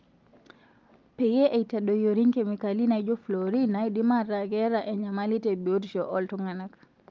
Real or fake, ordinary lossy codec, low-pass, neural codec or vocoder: real; Opus, 24 kbps; 7.2 kHz; none